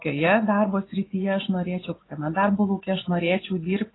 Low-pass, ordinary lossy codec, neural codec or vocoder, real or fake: 7.2 kHz; AAC, 16 kbps; none; real